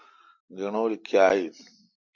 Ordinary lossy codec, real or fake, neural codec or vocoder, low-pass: MP3, 48 kbps; real; none; 7.2 kHz